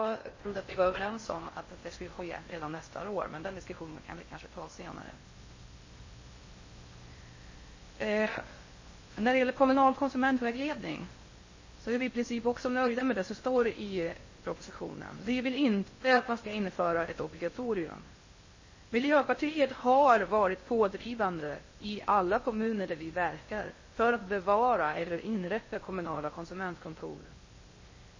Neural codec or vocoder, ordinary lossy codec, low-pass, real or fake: codec, 16 kHz in and 24 kHz out, 0.6 kbps, FocalCodec, streaming, 4096 codes; MP3, 32 kbps; 7.2 kHz; fake